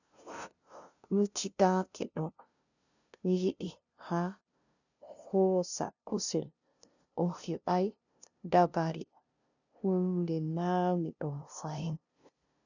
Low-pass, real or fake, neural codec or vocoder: 7.2 kHz; fake; codec, 16 kHz, 0.5 kbps, FunCodec, trained on LibriTTS, 25 frames a second